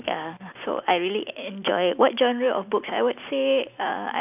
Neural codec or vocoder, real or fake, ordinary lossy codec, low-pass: none; real; none; 3.6 kHz